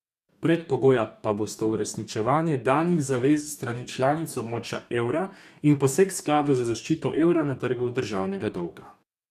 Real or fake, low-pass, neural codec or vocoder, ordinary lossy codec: fake; 14.4 kHz; codec, 44.1 kHz, 2.6 kbps, DAC; none